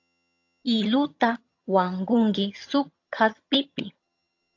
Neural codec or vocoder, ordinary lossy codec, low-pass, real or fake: vocoder, 22.05 kHz, 80 mel bands, HiFi-GAN; AAC, 48 kbps; 7.2 kHz; fake